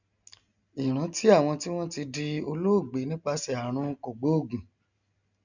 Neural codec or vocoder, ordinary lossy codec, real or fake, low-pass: none; none; real; 7.2 kHz